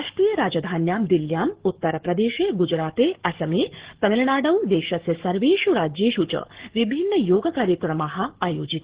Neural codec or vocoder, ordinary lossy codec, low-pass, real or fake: codec, 44.1 kHz, 7.8 kbps, DAC; Opus, 16 kbps; 3.6 kHz; fake